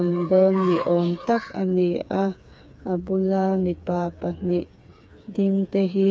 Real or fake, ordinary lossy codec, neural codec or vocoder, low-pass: fake; none; codec, 16 kHz, 4 kbps, FreqCodec, smaller model; none